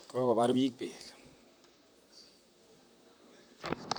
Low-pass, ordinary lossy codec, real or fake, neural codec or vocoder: none; none; fake; vocoder, 44.1 kHz, 128 mel bands, Pupu-Vocoder